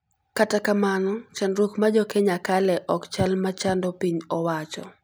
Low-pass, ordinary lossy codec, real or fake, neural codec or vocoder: none; none; real; none